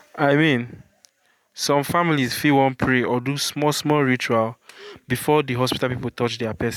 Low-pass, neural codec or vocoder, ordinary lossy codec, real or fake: 19.8 kHz; vocoder, 44.1 kHz, 128 mel bands every 512 samples, BigVGAN v2; none; fake